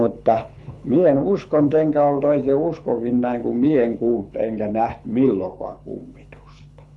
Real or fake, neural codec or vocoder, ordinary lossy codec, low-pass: fake; codec, 24 kHz, 6 kbps, HILCodec; none; none